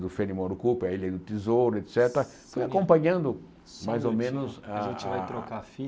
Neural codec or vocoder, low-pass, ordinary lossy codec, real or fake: none; none; none; real